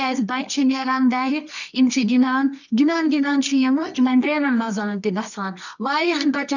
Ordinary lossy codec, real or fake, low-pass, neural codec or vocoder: none; fake; 7.2 kHz; codec, 24 kHz, 0.9 kbps, WavTokenizer, medium music audio release